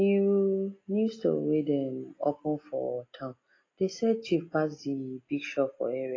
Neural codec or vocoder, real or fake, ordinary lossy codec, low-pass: none; real; AAC, 32 kbps; 7.2 kHz